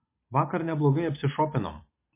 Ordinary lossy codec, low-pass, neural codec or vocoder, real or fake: MP3, 24 kbps; 3.6 kHz; none; real